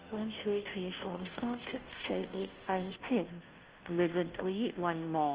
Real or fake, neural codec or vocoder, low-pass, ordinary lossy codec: fake; codec, 16 kHz, 0.5 kbps, FunCodec, trained on Chinese and English, 25 frames a second; 3.6 kHz; Opus, 16 kbps